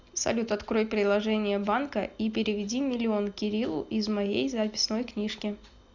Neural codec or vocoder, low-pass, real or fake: none; 7.2 kHz; real